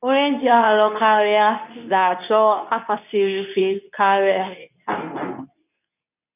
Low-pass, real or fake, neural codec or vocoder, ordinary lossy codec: 3.6 kHz; fake; codec, 24 kHz, 0.9 kbps, WavTokenizer, medium speech release version 2; none